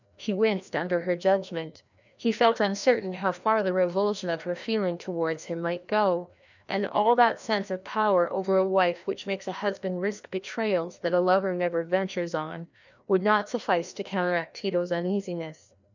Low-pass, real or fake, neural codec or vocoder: 7.2 kHz; fake; codec, 16 kHz, 1 kbps, FreqCodec, larger model